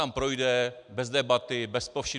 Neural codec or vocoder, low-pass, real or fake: none; 10.8 kHz; real